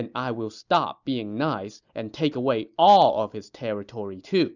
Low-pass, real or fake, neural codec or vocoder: 7.2 kHz; real; none